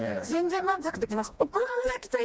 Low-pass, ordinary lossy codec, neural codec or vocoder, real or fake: none; none; codec, 16 kHz, 1 kbps, FreqCodec, smaller model; fake